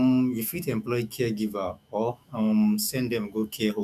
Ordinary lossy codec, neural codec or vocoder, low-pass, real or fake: none; autoencoder, 48 kHz, 128 numbers a frame, DAC-VAE, trained on Japanese speech; 14.4 kHz; fake